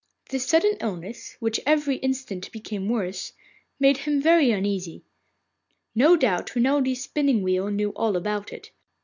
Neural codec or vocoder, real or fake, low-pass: none; real; 7.2 kHz